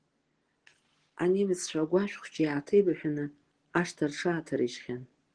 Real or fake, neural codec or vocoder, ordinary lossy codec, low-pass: real; none; Opus, 16 kbps; 9.9 kHz